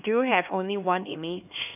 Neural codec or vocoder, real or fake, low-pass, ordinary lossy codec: codec, 16 kHz, 2 kbps, X-Codec, HuBERT features, trained on LibriSpeech; fake; 3.6 kHz; none